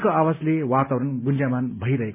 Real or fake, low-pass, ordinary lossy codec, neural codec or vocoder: real; 3.6 kHz; MP3, 32 kbps; none